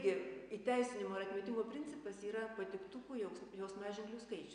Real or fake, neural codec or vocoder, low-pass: real; none; 9.9 kHz